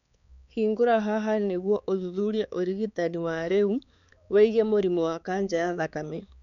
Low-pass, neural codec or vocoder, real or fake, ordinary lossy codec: 7.2 kHz; codec, 16 kHz, 4 kbps, X-Codec, HuBERT features, trained on balanced general audio; fake; none